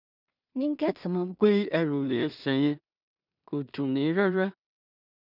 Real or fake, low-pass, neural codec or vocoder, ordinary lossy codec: fake; 5.4 kHz; codec, 16 kHz in and 24 kHz out, 0.4 kbps, LongCat-Audio-Codec, two codebook decoder; none